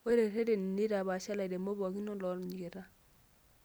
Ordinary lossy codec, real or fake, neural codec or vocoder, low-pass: none; real; none; none